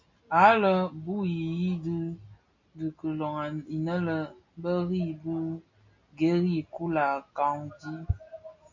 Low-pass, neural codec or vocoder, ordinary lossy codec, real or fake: 7.2 kHz; none; MP3, 48 kbps; real